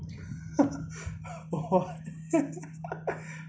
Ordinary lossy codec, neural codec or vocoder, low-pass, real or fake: none; none; none; real